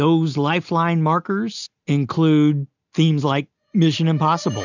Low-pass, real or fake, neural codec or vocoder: 7.2 kHz; real; none